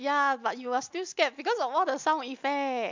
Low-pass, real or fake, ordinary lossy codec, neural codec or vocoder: 7.2 kHz; real; MP3, 64 kbps; none